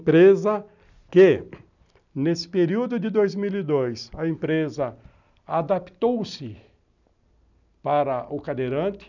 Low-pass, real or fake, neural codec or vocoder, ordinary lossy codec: 7.2 kHz; real; none; none